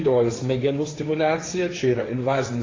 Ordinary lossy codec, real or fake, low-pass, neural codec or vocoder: AAC, 32 kbps; fake; 7.2 kHz; codec, 16 kHz, 1.1 kbps, Voila-Tokenizer